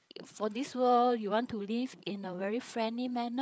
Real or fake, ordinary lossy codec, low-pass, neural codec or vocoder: fake; none; none; codec, 16 kHz, 8 kbps, FreqCodec, larger model